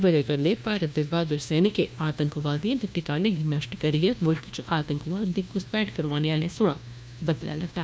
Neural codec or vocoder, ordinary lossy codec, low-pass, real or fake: codec, 16 kHz, 1 kbps, FunCodec, trained on LibriTTS, 50 frames a second; none; none; fake